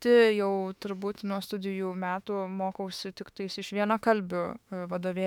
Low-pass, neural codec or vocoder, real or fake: 19.8 kHz; autoencoder, 48 kHz, 32 numbers a frame, DAC-VAE, trained on Japanese speech; fake